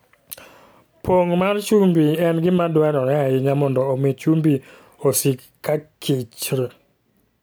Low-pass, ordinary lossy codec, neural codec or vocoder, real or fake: none; none; none; real